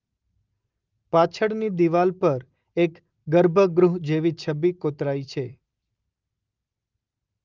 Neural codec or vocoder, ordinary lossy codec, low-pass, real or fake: none; Opus, 32 kbps; 7.2 kHz; real